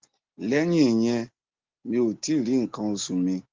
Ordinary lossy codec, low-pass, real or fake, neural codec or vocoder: Opus, 16 kbps; 7.2 kHz; real; none